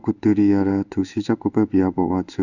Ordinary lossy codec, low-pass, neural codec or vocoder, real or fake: none; 7.2 kHz; codec, 16 kHz in and 24 kHz out, 1 kbps, XY-Tokenizer; fake